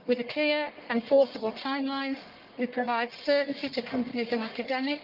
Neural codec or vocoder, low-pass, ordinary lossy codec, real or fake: codec, 44.1 kHz, 1.7 kbps, Pupu-Codec; 5.4 kHz; Opus, 32 kbps; fake